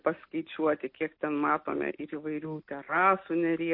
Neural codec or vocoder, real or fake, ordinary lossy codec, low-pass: none; real; MP3, 32 kbps; 5.4 kHz